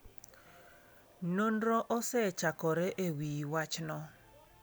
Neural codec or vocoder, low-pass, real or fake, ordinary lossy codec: none; none; real; none